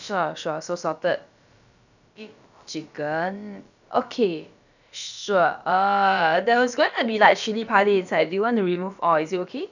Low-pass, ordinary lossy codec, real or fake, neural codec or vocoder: 7.2 kHz; none; fake; codec, 16 kHz, about 1 kbps, DyCAST, with the encoder's durations